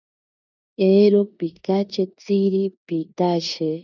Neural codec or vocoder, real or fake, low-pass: codec, 16 kHz in and 24 kHz out, 0.9 kbps, LongCat-Audio-Codec, four codebook decoder; fake; 7.2 kHz